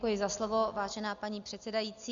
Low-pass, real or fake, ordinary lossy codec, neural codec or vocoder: 7.2 kHz; real; Opus, 64 kbps; none